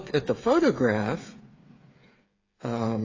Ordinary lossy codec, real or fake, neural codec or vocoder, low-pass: AAC, 32 kbps; fake; codec, 16 kHz, 8 kbps, FreqCodec, smaller model; 7.2 kHz